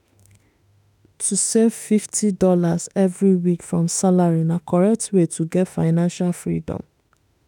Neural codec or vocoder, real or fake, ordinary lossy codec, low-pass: autoencoder, 48 kHz, 32 numbers a frame, DAC-VAE, trained on Japanese speech; fake; none; 19.8 kHz